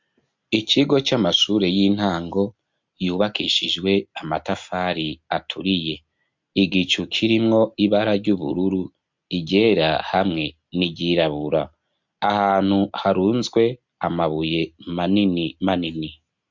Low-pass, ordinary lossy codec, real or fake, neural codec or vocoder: 7.2 kHz; MP3, 64 kbps; real; none